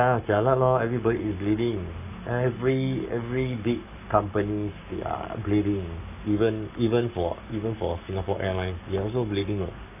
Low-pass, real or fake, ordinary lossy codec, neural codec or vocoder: 3.6 kHz; fake; none; codec, 44.1 kHz, 7.8 kbps, Pupu-Codec